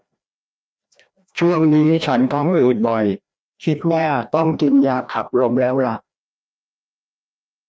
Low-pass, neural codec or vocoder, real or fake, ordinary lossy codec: none; codec, 16 kHz, 1 kbps, FreqCodec, larger model; fake; none